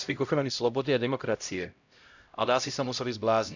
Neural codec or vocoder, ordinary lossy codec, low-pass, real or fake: codec, 16 kHz, 0.5 kbps, X-Codec, HuBERT features, trained on LibriSpeech; none; 7.2 kHz; fake